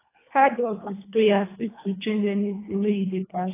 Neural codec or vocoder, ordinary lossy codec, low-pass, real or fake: codec, 24 kHz, 1.5 kbps, HILCodec; AAC, 16 kbps; 3.6 kHz; fake